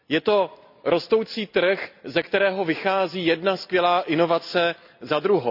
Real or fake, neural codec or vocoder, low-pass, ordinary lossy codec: real; none; 5.4 kHz; none